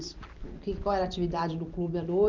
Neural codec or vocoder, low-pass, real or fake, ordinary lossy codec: none; 7.2 kHz; real; Opus, 32 kbps